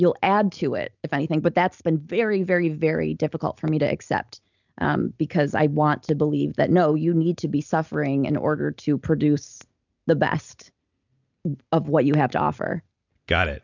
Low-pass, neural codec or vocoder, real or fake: 7.2 kHz; none; real